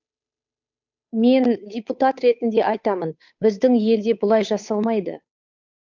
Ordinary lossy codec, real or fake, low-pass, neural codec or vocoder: MP3, 64 kbps; fake; 7.2 kHz; codec, 16 kHz, 8 kbps, FunCodec, trained on Chinese and English, 25 frames a second